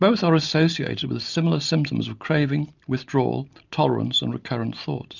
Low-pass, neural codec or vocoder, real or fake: 7.2 kHz; none; real